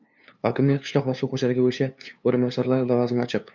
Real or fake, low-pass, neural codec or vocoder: fake; 7.2 kHz; codec, 16 kHz, 2 kbps, FunCodec, trained on LibriTTS, 25 frames a second